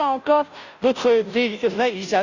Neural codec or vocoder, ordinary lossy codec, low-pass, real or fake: codec, 16 kHz, 0.5 kbps, FunCodec, trained on Chinese and English, 25 frames a second; none; 7.2 kHz; fake